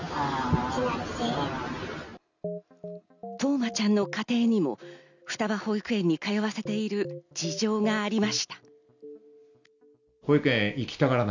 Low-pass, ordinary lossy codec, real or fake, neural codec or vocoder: 7.2 kHz; none; real; none